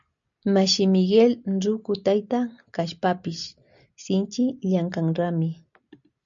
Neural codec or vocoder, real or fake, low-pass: none; real; 7.2 kHz